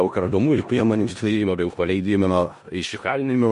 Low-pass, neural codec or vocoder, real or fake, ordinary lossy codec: 10.8 kHz; codec, 16 kHz in and 24 kHz out, 0.4 kbps, LongCat-Audio-Codec, four codebook decoder; fake; MP3, 48 kbps